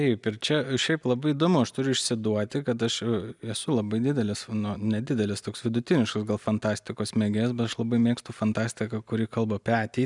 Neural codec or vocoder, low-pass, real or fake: none; 10.8 kHz; real